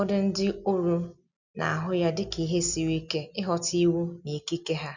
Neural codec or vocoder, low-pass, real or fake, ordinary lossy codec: none; 7.2 kHz; real; none